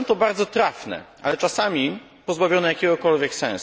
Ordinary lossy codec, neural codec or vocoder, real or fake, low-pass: none; none; real; none